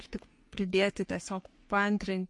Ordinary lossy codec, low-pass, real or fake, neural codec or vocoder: MP3, 48 kbps; 10.8 kHz; fake; codec, 44.1 kHz, 3.4 kbps, Pupu-Codec